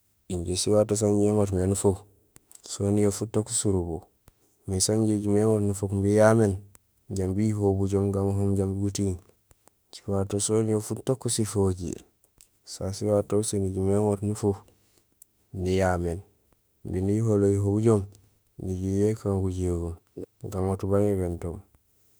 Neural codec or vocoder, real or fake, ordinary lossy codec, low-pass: autoencoder, 48 kHz, 32 numbers a frame, DAC-VAE, trained on Japanese speech; fake; none; none